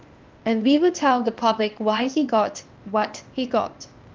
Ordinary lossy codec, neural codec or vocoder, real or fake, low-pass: Opus, 24 kbps; codec, 16 kHz, 0.8 kbps, ZipCodec; fake; 7.2 kHz